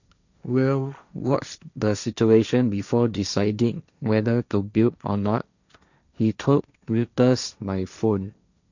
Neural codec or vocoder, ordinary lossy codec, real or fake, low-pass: codec, 16 kHz, 1.1 kbps, Voila-Tokenizer; none; fake; 7.2 kHz